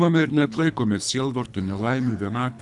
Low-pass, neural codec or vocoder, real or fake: 10.8 kHz; codec, 24 kHz, 3 kbps, HILCodec; fake